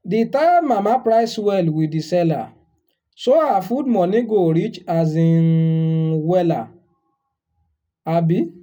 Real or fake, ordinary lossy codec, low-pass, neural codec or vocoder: real; none; 19.8 kHz; none